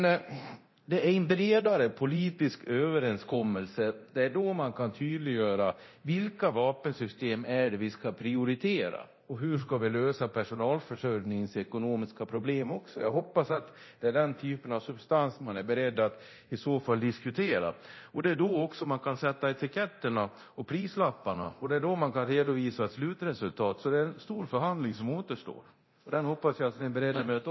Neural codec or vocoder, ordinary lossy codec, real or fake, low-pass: codec, 24 kHz, 0.9 kbps, DualCodec; MP3, 24 kbps; fake; 7.2 kHz